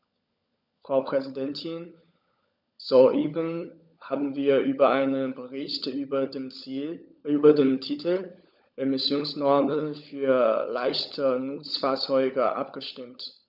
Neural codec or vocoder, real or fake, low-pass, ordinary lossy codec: codec, 16 kHz, 16 kbps, FunCodec, trained on LibriTTS, 50 frames a second; fake; 5.4 kHz; none